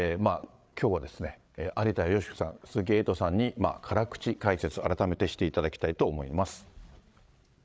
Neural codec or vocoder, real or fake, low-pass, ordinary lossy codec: codec, 16 kHz, 8 kbps, FreqCodec, larger model; fake; none; none